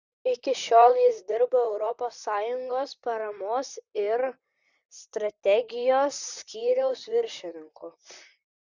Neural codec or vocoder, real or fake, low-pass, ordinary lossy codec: vocoder, 44.1 kHz, 128 mel bands, Pupu-Vocoder; fake; 7.2 kHz; Opus, 64 kbps